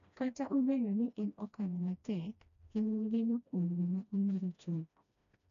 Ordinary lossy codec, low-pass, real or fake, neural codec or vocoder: none; 7.2 kHz; fake; codec, 16 kHz, 1 kbps, FreqCodec, smaller model